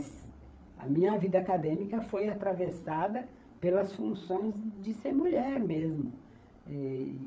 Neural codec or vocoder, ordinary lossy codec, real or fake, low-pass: codec, 16 kHz, 8 kbps, FreqCodec, larger model; none; fake; none